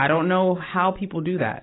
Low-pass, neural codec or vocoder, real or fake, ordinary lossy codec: 7.2 kHz; none; real; AAC, 16 kbps